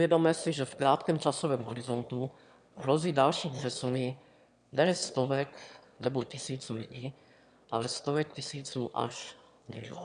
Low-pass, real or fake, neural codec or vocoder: 9.9 kHz; fake; autoencoder, 22.05 kHz, a latent of 192 numbers a frame, VITS, trained on one speaker